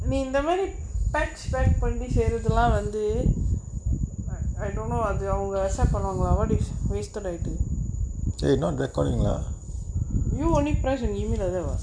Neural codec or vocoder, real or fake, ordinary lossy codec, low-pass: none; real; none; 9.9 kHz